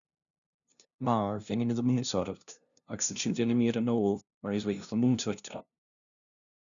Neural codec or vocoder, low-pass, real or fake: codec, 16 kHz, 0.5 kbps, FunCodec, trained on LibriTTS, 25 frames a second; 7.2 kHz; fake